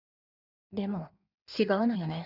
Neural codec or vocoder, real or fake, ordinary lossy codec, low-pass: codec, 16 kHz in and 24 kHz out, 1.1 kbps, FireRedTTS-2 codec; fake; none; 5.4 kHz